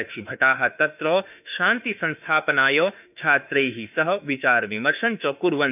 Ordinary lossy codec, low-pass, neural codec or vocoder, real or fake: none; 3.6 kHz; autoencoder, 48 kHz, 32 numbers a frame, DAC-VAE, trained on Japanese speech; fake